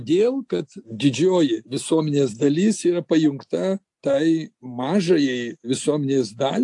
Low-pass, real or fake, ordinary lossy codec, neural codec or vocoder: 10.8 kHz; real; AAC, 64 kbps; none